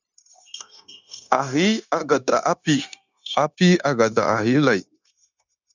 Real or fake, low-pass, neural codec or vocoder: fake; 7.2 kHz; codec, 16 kHz, 0.9 kbps, LongCat-Audio-Codec